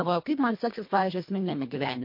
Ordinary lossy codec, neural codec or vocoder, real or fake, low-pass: MP3, 32 kbps; codec, 24 kHz, 1.5 kbps, HILCodec; fake; 5.4 kHz